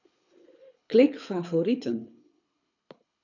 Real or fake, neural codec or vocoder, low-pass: fake; codec, 24 kHz, 6 kbps, HILCodec; 7.2 kHz